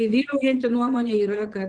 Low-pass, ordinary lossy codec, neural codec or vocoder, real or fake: 9.9 kHz; Opus, 16 kbps; vocoder, 22.05 kHz, 80 mel bands, Vocos; fake